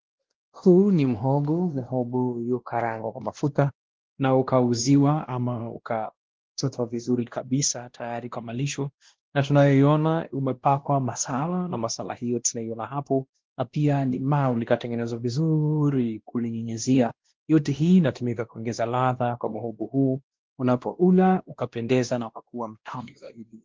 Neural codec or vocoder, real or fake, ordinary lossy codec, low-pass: codec, 16 kHz, 1 kbps, X-Codec, WavLM features, trained on Multilingual LibriSpeech; fake; Opus, 16 kbps; 7.2 kHz